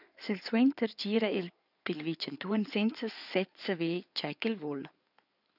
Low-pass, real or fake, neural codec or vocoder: 5.4 kHz; fake; codec, 16 kHz in and 24 kHz out, 2.2 kbps, FireRedTTS-2 codec